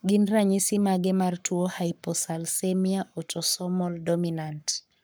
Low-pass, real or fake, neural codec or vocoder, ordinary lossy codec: none; fake; codec, 44.1 kHz, 7.8 kbps, Pupu-Codec; none